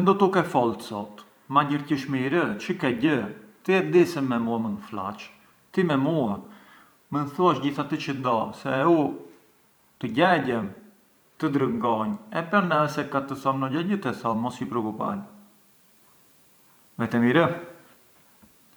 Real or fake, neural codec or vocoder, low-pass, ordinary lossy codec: fake; vocoder, 44.1 kHz, 128 mel bands every 512 samples, BigVGAN v2; none; none